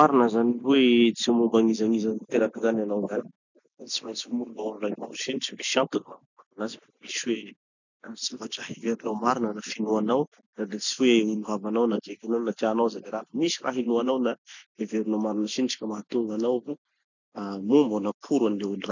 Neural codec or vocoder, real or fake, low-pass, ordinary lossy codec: none; real; 7.2 kHz; none